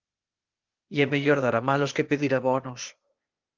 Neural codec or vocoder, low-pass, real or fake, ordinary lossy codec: codec, 16 kHz, 0.8 kbps, ZipCodec; 7.2 kHz; fake; Opus, 32 kbps